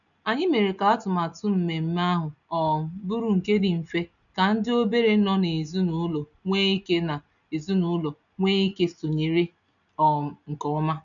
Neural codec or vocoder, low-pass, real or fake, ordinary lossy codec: none; 7.2 kHz; real; none